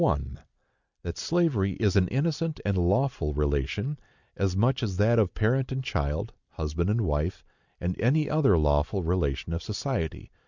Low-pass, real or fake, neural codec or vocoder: 7.2 kHz; real; none